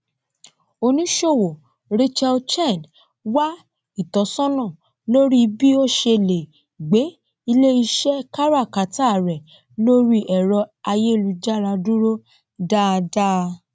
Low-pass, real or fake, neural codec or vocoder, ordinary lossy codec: none; real; none; none